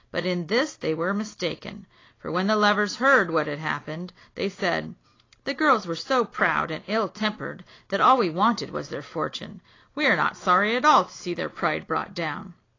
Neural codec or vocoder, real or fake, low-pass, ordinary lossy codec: none; real; 7.2 kHz; AAC, 32 kbps